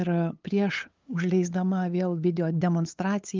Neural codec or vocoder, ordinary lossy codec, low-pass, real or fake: codec, 16 kHz, 8 kbps, FunCodec, trained on LibriTTS, 25 frames a second; Opus, 32 kbps; 7.2 kHz; fake